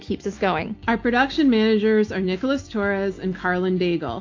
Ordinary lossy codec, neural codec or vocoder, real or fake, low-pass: AAC, 48 kbps; none; real; 7.2 kHz